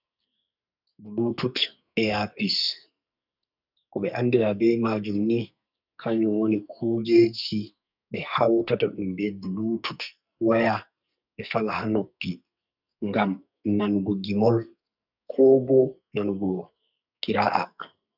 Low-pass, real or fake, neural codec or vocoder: 5.4 kHz; fake; codec, 44.1 kHz, 2.6 kbps, SNAC